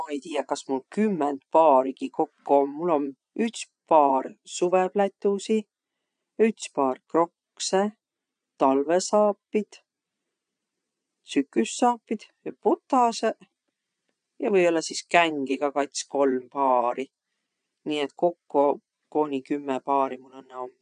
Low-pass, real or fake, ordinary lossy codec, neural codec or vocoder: 9.9 kHz; fake; none; vocoder, 22.05 kHz, 80 mel bands, Vocos